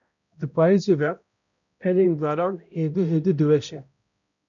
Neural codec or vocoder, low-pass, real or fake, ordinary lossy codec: codec, 16 kHz, 0.5 kbps, X-Codec, HuBERT features, trained on balanced general audio; 7.2 kHz; fake; MP3, 96 kbps